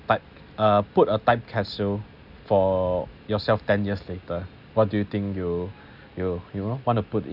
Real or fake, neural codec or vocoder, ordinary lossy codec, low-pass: real; none; none; 5.4 kHz